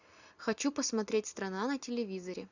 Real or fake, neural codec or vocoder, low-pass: real; none; 7.2 kHz